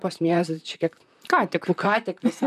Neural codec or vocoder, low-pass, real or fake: vocoder, 44.1 kHz, 128 mel bands, Pupu-Vocoder; 14.4 kHz; fake